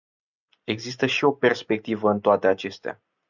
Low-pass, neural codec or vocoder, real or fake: 7.2 kHz; none; real